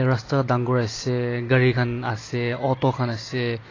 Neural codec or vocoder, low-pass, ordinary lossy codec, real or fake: vocoder, 44.1 kHz, 128 mel bands every 256 samples, BigVGAN v2; 7.2 kHz; AAC, 32 kbps; fake